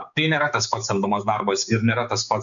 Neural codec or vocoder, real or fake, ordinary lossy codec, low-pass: codec, 16 kHz, 4 kbps, X-Codec, HuBERT features, trained on balanced general audio; fake; MP3, 96 kbps; 7.2 kHz